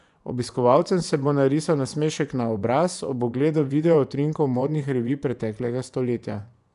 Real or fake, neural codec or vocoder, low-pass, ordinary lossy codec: fake; vocoder, 24 kHz, 100 mel bands, Vocos; 10.8 kHz; none